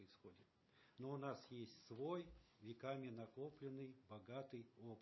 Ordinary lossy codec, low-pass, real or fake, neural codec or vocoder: MP3, 24 kbps; 7.2 kHz; real; none